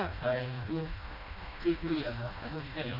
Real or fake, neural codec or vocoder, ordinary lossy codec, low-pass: fake; codec, 16 kHz, 1 kbps, FreqCodec, smaller model; none; 5.4 kHz